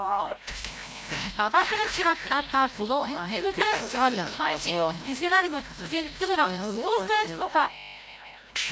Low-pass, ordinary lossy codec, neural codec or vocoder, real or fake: none; none; codec, 16 kHz, 0.5 kbps, FreqCodec, larger model; fake